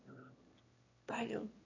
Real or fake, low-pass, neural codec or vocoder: fake; 7.2 kHz; autoencoder, 22.05 kHz, a latent of 192 numbers a frame, VITS, trained on one speaker